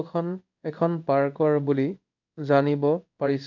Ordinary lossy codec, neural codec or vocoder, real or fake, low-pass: none; codec, 16 kHz in and 24 kHz out, 1 kbps, XY-Tokenizer; fake; 7.2 kHz